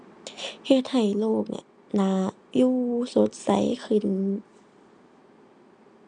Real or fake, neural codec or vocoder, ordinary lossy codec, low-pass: real; none; MP3, 96 kbps; 9.9 kHz